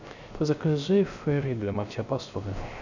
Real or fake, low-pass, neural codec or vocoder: fake; 7.2 kHz; codec, 16 kHz, 0.3 kbps, FocalCodec